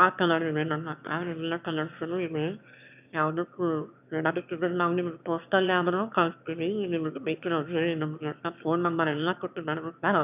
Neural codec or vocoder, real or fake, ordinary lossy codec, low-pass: autoencoder, 22.05 kHz, a latent of 192 numbers a frame, VITS, trained on one speaker; fake; none; 3.6 kHz